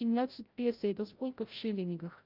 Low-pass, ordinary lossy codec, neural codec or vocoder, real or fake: 5.4 kHz; Opus, 32 kbps; codec, 16 kHz, 0.5 kbps, FreqCodec, larger model; fake